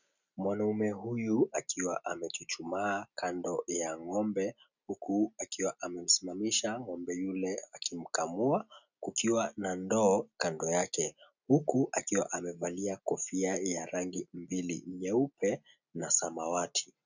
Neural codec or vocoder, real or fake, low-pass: none; real; 7.2 kHz